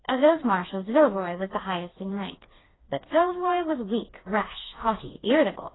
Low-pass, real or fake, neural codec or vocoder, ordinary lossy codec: 7.2 kHz; fake; codec, 16 kHz, 2 kbps, FreqCodec, smaller model; AAC, 16 kbps